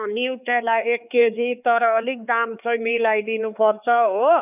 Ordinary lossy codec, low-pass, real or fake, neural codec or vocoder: none; 3.6 kHz; fake; codec, 16 kHz, 4 kbps, X-Codec, HuBERT features, trained on LibriSpeech